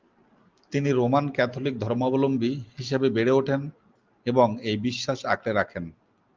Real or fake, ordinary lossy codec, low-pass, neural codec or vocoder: real; Opus, 24 kbps; 7.2 kHz; none